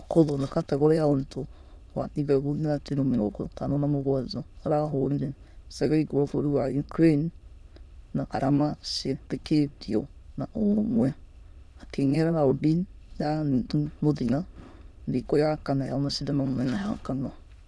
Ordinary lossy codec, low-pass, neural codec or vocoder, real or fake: none; none; autoencoder, 22.05 kHz, a latent of 192 numbers a frame, VITS, trained on many speakers; fake